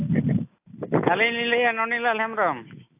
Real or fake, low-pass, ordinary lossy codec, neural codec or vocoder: real; 3.6 kHz; AAC, 32 kbps; none